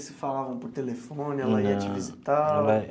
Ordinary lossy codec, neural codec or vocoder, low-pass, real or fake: none; none; none; real